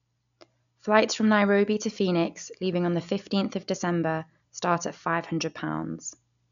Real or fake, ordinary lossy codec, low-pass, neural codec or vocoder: real; none; 7.2 kHz; none